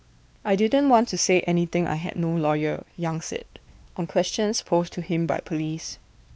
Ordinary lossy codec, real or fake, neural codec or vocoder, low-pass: none; fake; codec, 16 kHz, 2 kbps, X-Codec, WavLM features, trained on Multilingual LibriSpeech; none